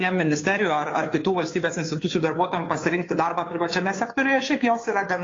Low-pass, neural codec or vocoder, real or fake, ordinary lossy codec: 7.2 kHz; codec, 16 kHz, 2 kbps, FunCodec, trained on Chinese and English, 25 frames a second; fake; AAC, 32 kbps